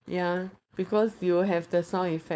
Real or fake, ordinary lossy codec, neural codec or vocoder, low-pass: fake; none; codec, 16 kHz, 4.8 kbps, FACodec; none